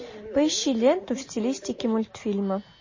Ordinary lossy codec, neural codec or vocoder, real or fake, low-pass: MP3, 32 kbps; none; real; 7.2 kHz